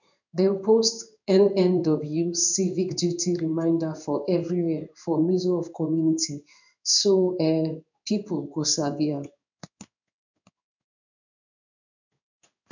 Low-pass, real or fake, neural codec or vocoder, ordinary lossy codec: 7.2 kHz; fake; codec, 16 kHz in and 24 kHz out, 1 kbps, XY-Tokenizer; none